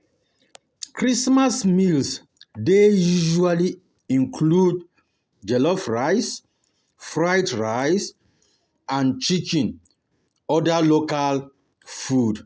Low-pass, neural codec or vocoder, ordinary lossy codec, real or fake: none; none; none; real